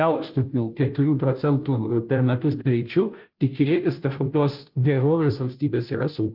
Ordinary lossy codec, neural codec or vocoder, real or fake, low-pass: Opus, 32 kbps; codec, 16 kHz, 0.5 kbps, FunCodec, trained on Chinese and English, 25 frames a second; fake; 5.4 kHz